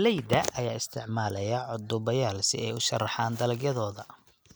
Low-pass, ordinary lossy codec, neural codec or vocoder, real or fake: none; none; none; real